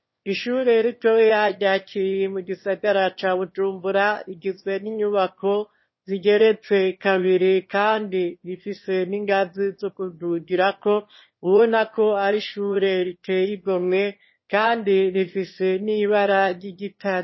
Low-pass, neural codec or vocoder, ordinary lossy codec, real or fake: 7.2 kHz; autoencoder, 22.05 kHz, a latent of 192 numbers a frame, VITS, trained on one speaker; MP3, 24 kbps; fake